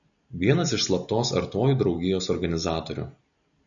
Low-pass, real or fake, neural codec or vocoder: 7.2 kHz; real; none